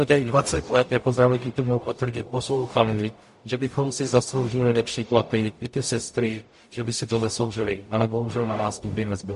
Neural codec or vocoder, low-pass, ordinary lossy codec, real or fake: codec, 44.1 kHz, 0.9 kbps, DAC; 14.4 kHz; MP3, 48 kbps; fake